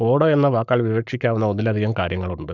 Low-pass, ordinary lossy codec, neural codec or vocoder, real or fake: 7.2 kHz; none; codec, 44.1 kHz, 7.8 kbps, Pupu-Codec; fake